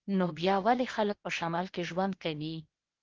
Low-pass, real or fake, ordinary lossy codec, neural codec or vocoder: 7.2 kHz; fake; Opus, 16 kbps; codec, 16 kHz, 0.8 kbps, ZipCodec